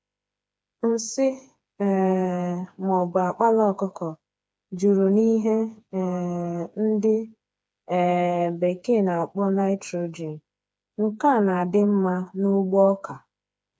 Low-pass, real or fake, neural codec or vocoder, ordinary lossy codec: none; fake; codec, 16 kHz, 4 kbps, FreqCodec, smaller model; none